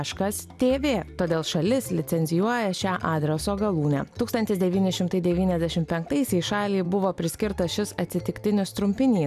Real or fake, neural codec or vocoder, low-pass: real; none; 14.4 kHz